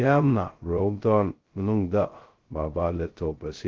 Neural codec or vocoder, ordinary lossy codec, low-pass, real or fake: codec, 16 kHz, 0.2 kbps, FocalCodec; Opus, 16 kbps; 7.2 kHz; fake